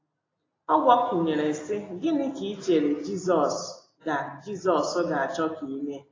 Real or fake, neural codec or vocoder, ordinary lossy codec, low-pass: real; none; AAC, 32 kbps; 7.2 kHz